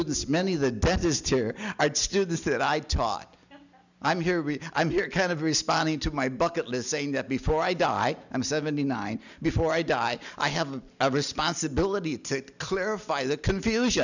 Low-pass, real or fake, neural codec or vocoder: 7.2 kHz; real; none